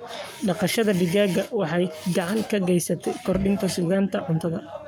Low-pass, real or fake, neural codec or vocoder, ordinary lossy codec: none; fake; codec, 44.1 kHz, 7.8 kbps, Pupu-Codec; none